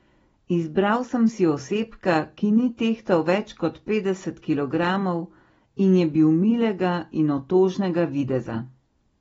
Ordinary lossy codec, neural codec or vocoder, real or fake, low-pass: AAC, 24 kbps; none; real; 19.8 kHz